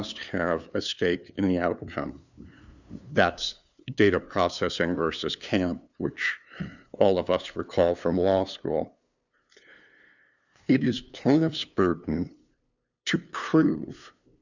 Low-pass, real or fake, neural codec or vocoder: 7.2 kHz; fake; codec, 16 kHz, 2 kbps, FunCodec, trained on LibriTTS, 25 frames a second